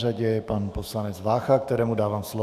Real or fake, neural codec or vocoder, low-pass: fake; autoencoder, 48 kHz, 128 numbers a frame, DAC-VAE, trained on Japanese speech; 14.4 kHz